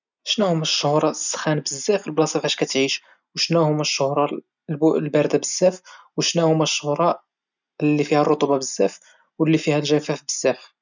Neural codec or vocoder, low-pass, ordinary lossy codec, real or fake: none; 7.2 kHz; none; real